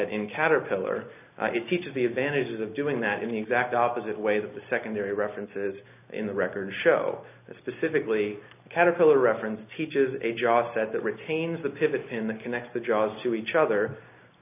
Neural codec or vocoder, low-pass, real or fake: none; 3.6 kHz; real